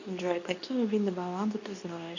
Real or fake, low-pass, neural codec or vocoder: fake; 7.2 kHz; codec, 24 kHz, 0.9 kbps, WavTokenizer, medium speech release version 2